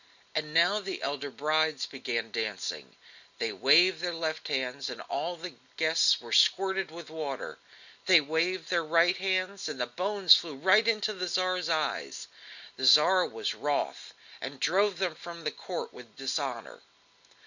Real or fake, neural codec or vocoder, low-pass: real; none; 7.2 kHz